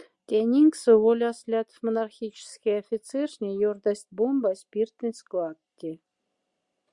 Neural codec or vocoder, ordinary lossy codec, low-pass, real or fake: none; Opus, 64 kbps; 10.8 kHz; real